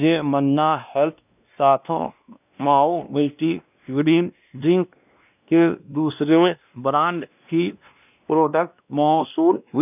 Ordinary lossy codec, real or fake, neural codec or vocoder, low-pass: none; fake; codec, 16 kHz, 1 kbps, X-Codec, WavLM features, trained on Multilingual LibriSpeech; 3.6 kHz